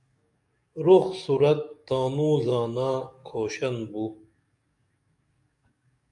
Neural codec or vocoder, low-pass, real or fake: codec, 44.1 kHz, 7.8 kbps, DAC; 10.8 kHz; fake